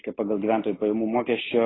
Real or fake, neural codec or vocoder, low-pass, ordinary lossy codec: real; none; 7.2 kHz; AAC, 16 kbps